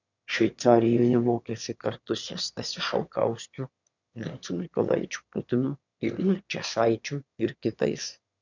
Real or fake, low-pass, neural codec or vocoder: fake; 7.2 kHz; autoencoder, 22.05 kHz, a latent of 192 numbers a frame, VITS, trained on one speaker